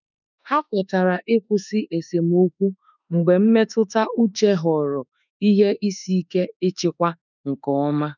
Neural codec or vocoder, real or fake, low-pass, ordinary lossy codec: autoencoder, 48 kHz, 32 numbers a frame, DAC-VAE, trained on Japanese speech; fake; 7.2 kHz; none